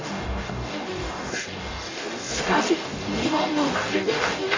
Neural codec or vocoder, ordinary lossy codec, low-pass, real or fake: codec, 44.1 kHz, 0.9 kbps, DAC; AAC, 32 kbps; 7.2 kHz; fake